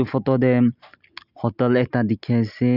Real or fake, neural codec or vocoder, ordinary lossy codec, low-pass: real; none; none; 5.4 kHz